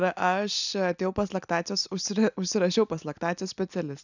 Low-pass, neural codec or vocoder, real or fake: 7.2 kHz; none; real